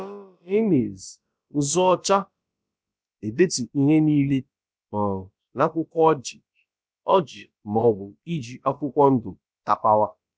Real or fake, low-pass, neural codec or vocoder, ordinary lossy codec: fake; none; codec, 16 kHz, about 1 kbps, DyCAST, with the encoder's durations; none